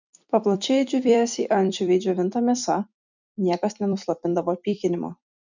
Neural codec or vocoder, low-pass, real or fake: vocoder, 44.1 kHz, 128 mel bands every 256 samples, BigVGAN v2; 7.2 kHz; fake